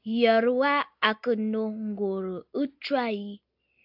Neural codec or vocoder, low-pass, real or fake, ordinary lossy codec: none; 5.4 kHz; real; AAC, 48 kbps